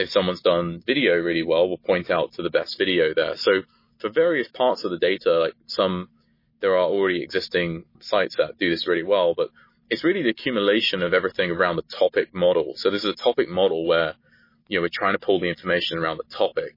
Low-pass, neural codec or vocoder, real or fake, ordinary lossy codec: 5.4 kHz; none; real; MP3, 24 kbps